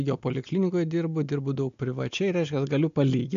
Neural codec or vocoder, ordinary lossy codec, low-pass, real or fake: none; AAC, 64 kbps; 7.2 kHz; real